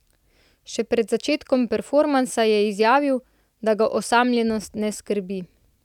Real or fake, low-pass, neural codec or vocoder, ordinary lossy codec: real; 19.8 kHz; none; none